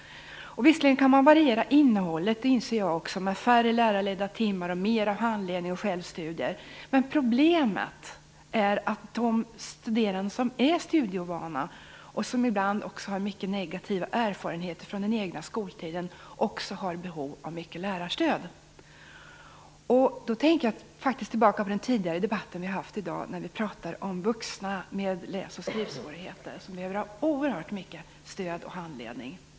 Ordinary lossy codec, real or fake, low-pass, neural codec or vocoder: none; real; none; none